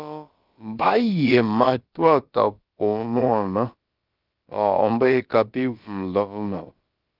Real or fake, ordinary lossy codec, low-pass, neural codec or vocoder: fake; Opus, 32 kbps; 5.4 kHz; codec, 16 kHz, about 1 kbps, DyCAST, with the encoder's durations